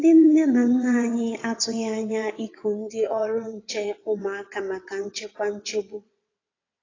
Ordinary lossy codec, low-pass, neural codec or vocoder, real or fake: AAC, 32 kbps; 7.2 kHz; vocoder, 22.05 kHz, 80 mel bands, WaveNeXt; fake